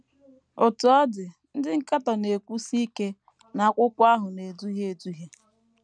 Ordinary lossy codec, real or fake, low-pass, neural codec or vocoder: none; real; 9.9 kHz; none